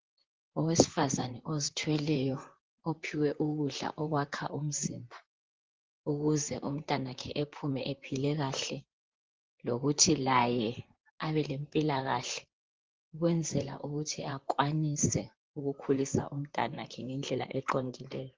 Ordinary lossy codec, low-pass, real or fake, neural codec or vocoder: Opus, 16 kbps; 7.2 kHz; fake; vocoder, 44.1 kHz, 128 mel bands, Pupu-Vocoder